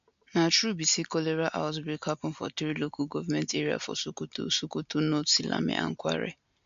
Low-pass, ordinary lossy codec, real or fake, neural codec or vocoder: 7.2 kHz; MP3, 64 kbps; real; none